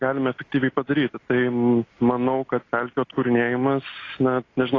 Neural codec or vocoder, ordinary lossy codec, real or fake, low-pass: none; AAC, 32 kbps; real; 7.2 kHz